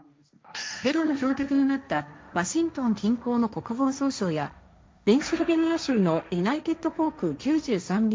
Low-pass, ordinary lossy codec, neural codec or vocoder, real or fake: none; none; codec, 16 kHz, 1.1 kbps, Voila-Tokenizer; fake